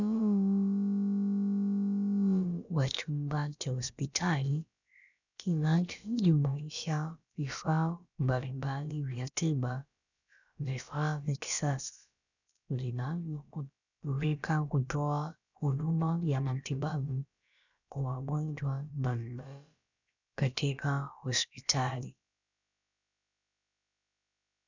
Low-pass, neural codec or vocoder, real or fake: 7.2 kHz; codec, 16 kHz, about 1 kbps, DyCAST, with the encoder's durations; fake